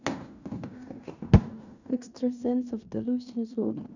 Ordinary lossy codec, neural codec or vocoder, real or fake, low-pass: none; codec, 16 kHz in and 24 kHz out, 0.9 kbps, LongCat-Audio-Codec, fine tuned four codebook decoder; fake; 7.2 kHz